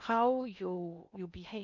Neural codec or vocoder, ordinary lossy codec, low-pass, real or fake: codec, 16 kHz in and 24 kHz out, 0.8 kbps, FocalCodec, streaming, 65536 codes; none; 7.2 kHz; fake